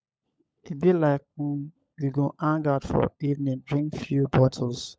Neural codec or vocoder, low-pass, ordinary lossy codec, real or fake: codec, 16 kHz, 16 kbps, FunCodec, trained on LibriTTS, 50 frames a second; none; none; fake